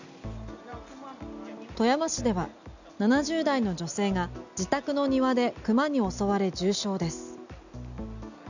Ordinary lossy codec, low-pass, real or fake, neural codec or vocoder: none; 7.2 kHz; real; none